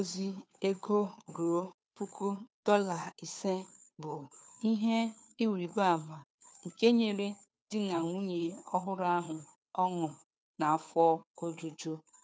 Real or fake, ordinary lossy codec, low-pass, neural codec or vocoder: fake; none; none; codec, 16 kHz, 2 kbps, FunCodec, trained on Chinese and English, 25 frames a second